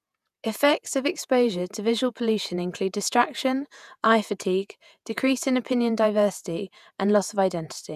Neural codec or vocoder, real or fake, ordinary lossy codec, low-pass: vocoder, 48 kHz, 128 mel bands, Vocos; fake; none; 14.4 kHz